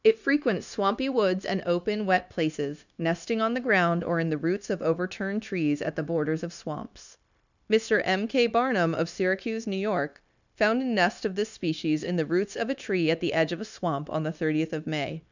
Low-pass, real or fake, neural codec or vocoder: 7.2 kHz; fake; codec, 16 kHz, 0.9 kbps, LongCat-Audio-Codec